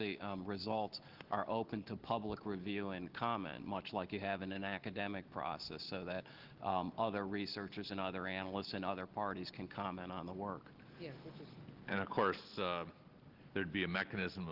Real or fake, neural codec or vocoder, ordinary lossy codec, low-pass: real; none; Opus, 16 kbps; 5.4 kHz